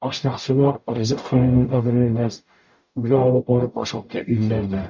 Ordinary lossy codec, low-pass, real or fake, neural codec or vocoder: MP3, 48 kbps; 7.2 kHz; fake; codec, 44.1 kHz, 0.9 kbps, DAC